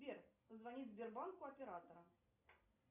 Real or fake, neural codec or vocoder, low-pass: real; none; 3.6 kHz